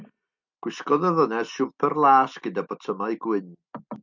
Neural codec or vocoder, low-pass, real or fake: none; 7.2 kHz; real